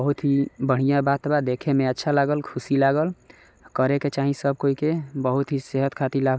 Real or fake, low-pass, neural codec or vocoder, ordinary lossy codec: real; none; none; none